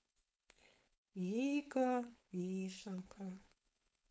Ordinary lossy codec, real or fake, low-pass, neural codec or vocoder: none; fake; none; codec, 16 kHz, 4.8 kbps, FACodec